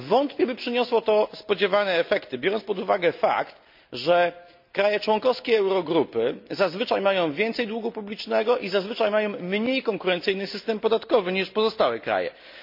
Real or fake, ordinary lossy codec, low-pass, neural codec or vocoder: real; MP3, 48 kbps; 5.4 kHz; none